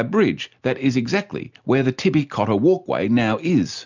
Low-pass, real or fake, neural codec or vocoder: 7.2 kHz; real; none